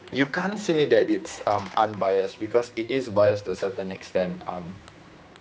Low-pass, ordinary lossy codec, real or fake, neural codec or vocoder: none; none; fake; codec, 16 kHz, 2 kbps, X-Codec, HuBERT features, trained on general audio